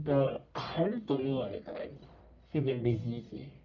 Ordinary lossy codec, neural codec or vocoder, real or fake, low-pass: none; codec, 44.1 kHz, 1.7 kbps, Pupu-Codec; fake; 7.2 kHz